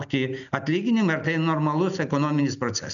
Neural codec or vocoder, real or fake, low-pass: none; real; 7.2 kHz